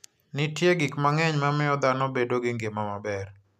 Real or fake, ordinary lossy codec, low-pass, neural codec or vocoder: real; none; 14.4 kHz; none